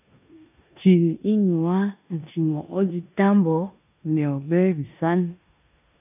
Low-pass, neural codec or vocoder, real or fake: 3.6 kHz; codec, 16 kHz in and 24 kHz out, 0.9 kbps, LongCat-Audio-Codec, four codebook decoder; fake